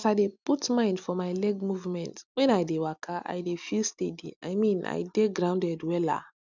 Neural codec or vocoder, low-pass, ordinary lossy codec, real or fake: none; 7.2 kHz; none; real